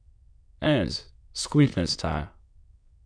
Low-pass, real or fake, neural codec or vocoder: 9.9 kHz; fake; autoencoder, 22.05 kHz, a latent of 192 numbers a frame, VITS, trained on many speakers